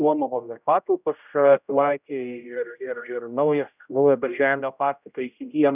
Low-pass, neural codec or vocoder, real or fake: 3.6 kHz; codec, 16 kHz, 0.5 kbps, X-Codec, HuBERT features, trained on general audio; fake